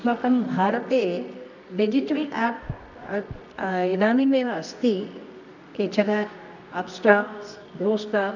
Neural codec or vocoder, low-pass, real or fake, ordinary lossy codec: codec, 24 kHz, 0.9 kbps, WavTokenizer, medium music audio release; 7.2 kHz; fake; none